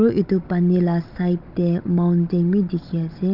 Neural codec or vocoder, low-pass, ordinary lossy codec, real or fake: codec, 16 kHz, 16 kbps, FunCodec, trained on Chinese and English, 50 frames a second; 5.4 kHz; Opus, 32 kbps; fake